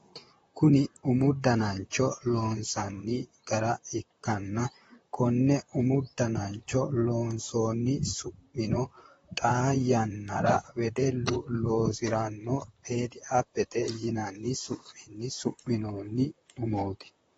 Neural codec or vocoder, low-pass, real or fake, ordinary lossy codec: vocoder, 44.1 kHz, 128 mel bands, Pupu-Vocoder; 19.8 kHz; fake; AAC, 24 kbps